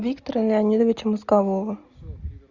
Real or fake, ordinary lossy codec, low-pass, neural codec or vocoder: real; Opus, 64 kbps; 7.2 kHz; none